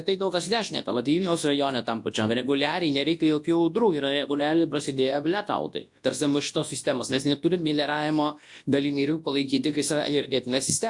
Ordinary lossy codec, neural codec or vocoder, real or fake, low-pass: AAC, 64 kbps; codec, 24 kHz, 0.9 kbps, WavTokenizer, large speech release; fake; 10.8 kHz